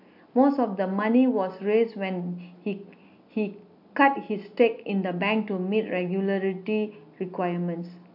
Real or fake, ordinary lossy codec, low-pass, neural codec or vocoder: real; AAC, 48 kbps; 5.4 kHz; none